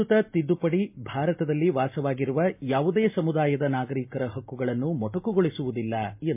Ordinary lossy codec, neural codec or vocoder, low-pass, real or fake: MP3, 32 kbps; none; 3.6 kHz; real